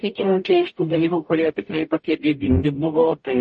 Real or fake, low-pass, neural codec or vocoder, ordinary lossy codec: fake; 10.8 kHz; codec, 44.1 kHz, 0.9 kbps, DAC; MP3, 32 kbps